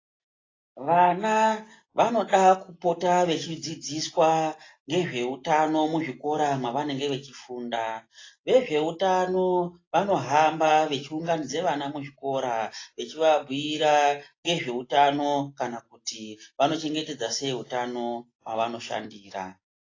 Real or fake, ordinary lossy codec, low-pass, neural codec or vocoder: real; AAC, 32 kbps; 7.2 kHz; none